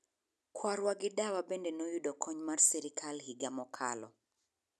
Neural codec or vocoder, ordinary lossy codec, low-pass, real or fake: none; none; none; real